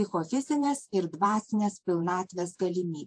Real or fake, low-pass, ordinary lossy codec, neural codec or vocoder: fake; 9.9 kHz; AAC, 64 kbps; vocoder, 48 kHz, 128 mel bands, Vocos